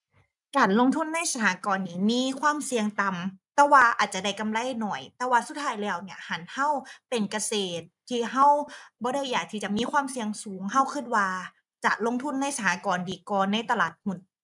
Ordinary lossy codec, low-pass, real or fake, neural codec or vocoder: none; 10.8 kHz; real; none